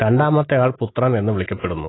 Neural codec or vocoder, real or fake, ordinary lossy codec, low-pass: none; real; AAC, 16 kbps; 7.2 kHz